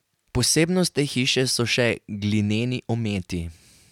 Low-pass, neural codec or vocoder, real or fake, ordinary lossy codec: 19.8 kHz; none; real; none